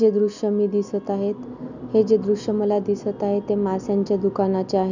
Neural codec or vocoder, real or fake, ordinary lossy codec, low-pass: none; real; none; 7.2 kHz